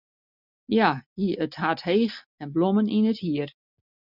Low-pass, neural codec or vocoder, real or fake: 5.4 kHz; none; real